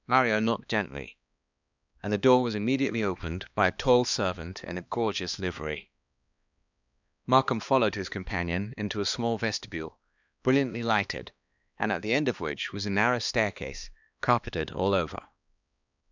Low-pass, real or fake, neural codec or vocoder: 7.2 kHz; fake; codec, 16 kHz, 2 kbps, X-Codec, HuBERT features, trained on balanced general audio